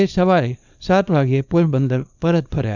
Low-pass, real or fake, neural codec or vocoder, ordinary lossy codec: 7.2 kHz; fake; codec, 24 kHz, 0.9 kbps, WavTokenizer, small release; none